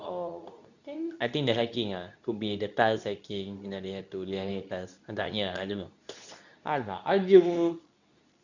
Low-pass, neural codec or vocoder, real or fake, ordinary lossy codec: 7.2 kHz; codec, 24 kHz, 0.9 kbps, WavTokenizer, medium speech release version 2; fake; none